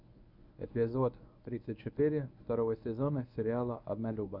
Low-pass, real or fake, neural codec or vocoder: 5.4 kHz; fake; codec, 24 kHz, 0.9 kbps, WavTokenizer, medium speech release version 1